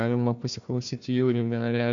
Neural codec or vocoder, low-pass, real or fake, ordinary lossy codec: codec, 16 kHz, 1 kbps, FunCodec, trained on Chinese and English, 50 frames a second; 7.2 kHz; fake; MP3, 64 kbps